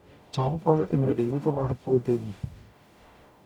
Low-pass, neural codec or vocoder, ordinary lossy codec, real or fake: 19.8 kHz; codec, 44.1 kHz, 0.9 kbps, DAC; none; fake